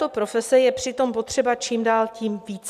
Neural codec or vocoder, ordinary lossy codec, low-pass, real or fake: none; MP3, 96 kbps; 14.4 kHz; real